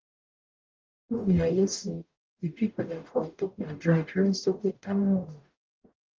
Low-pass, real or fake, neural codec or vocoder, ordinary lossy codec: 7.2 kHz; fake; codec, 44.1 kHz, 0.9 kbps, DAC; Opus, 24 kbps